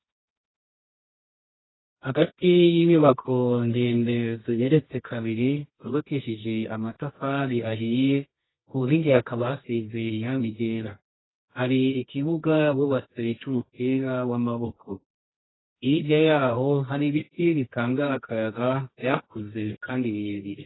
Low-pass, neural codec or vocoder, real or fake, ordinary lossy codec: 7.2 kHz; codec, 24 kHz, 0.9 kbps, WavTokenizer, medium music audio release; fake; AAC, 16 kbps